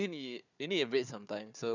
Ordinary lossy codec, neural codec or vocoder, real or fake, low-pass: none; codec, 16 kHz, 4 kbps, FunCodec, trained on Chinese and English, 50 frames a second; fake; 7.2 kHz